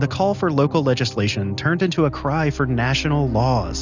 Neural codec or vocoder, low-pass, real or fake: none; 7.2 kHz; real